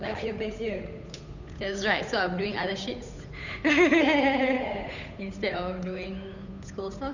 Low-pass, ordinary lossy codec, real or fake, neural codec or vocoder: 7.2 kHz; none; fake; codec, 16 kHz, 8 kbps, FunCodec, trained on Chinese and English, 25 frames a second